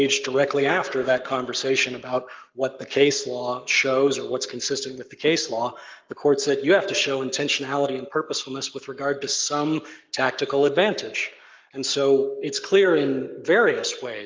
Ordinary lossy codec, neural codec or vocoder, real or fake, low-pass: Opus, 32 kbps; codec, 44.1 kHz, 7.8 kbps, Pupu-Codec; fake; 7.2 kHz